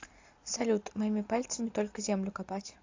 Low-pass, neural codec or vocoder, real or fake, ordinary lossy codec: 7.2 kHz; none; real; AAC, 48 kbps